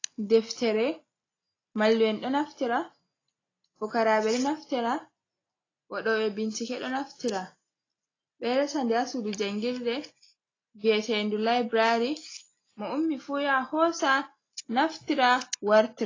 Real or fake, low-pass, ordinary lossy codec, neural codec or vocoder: real; 7.2 kHz; AAC, 32 kbps; none